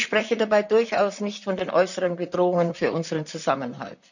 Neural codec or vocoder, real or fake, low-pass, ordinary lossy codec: vocoder, 44.1 kHz, 128 mel bands, Pupu-Vocoder; fake; 7.2 kHz; none